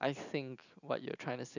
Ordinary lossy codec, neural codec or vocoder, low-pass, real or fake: none; none; 7.2 kHz; real